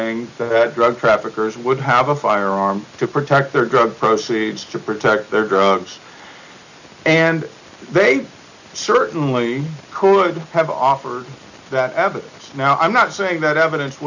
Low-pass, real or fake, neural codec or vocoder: 7.2 kHz; real; none